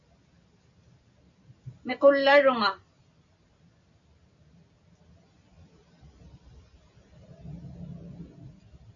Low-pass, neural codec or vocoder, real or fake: 7.2 kHz; none; real